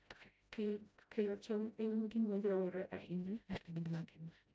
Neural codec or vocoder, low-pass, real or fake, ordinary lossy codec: codec, 16 kHz, 0.5 kbps, FreqCodec, smaller model; none; fake; none